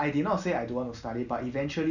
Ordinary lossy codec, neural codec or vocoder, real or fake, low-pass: none; none; real; 7.2 kHz